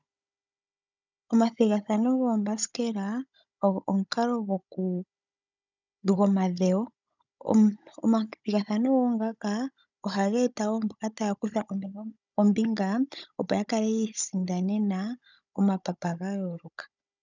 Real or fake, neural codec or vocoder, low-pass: fake; codec, 16 kHz, 16 kbps, FunCodec, trained on Chinese and English, 50 frames a second; 7.2 kHz